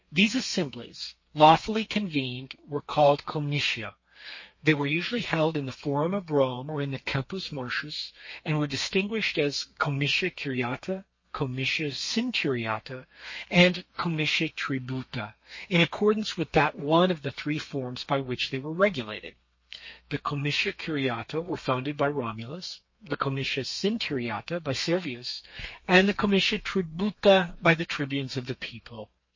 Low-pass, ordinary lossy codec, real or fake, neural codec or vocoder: 7.2 kHz; MP3, 32 kbps; fake; codec, 32 kHz, 1.9 kbps, SNAC